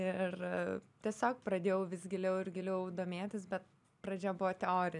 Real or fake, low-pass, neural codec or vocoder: real; 10.8 kHz; none